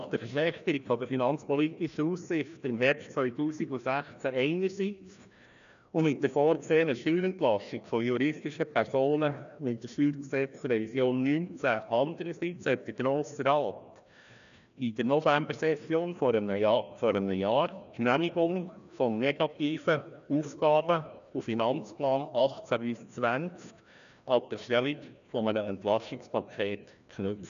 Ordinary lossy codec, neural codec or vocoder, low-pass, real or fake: none; codec, 16 kHz, 1 kbps, FreqCodec, larger model; 7.2 kHz; fake